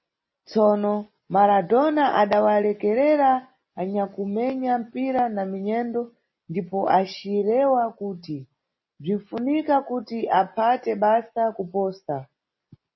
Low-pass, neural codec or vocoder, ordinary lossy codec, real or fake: 7.2 kHz; none; MP3, 24 kbps; real